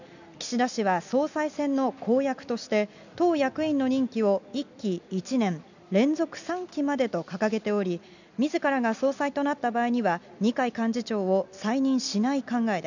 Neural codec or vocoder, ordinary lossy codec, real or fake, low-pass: none; none; real; 7.2 kHz